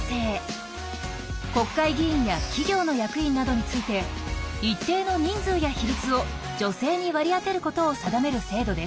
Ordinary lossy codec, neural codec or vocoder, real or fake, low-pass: none; none; real; none